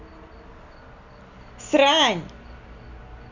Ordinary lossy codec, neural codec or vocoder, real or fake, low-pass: none; none; real; 7.2 kHz